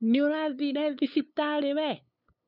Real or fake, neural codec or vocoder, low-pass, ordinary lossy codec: fake; codec, 16 kHz, 8 kbps, FunCodec, trained on LibriTTS, 25 frames a second; 5.4 kHz; none